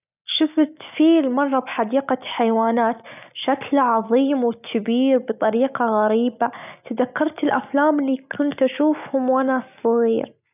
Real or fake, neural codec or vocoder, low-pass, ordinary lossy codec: real; none; 3.6 kHz; none